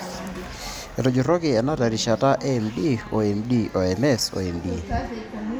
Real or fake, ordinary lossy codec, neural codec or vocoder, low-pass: real; none; none; none